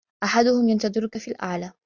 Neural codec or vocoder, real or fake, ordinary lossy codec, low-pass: none; real; Opus, 64 kbps; 7.2 kHz